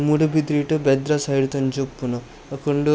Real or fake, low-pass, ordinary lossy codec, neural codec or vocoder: real; none; none; none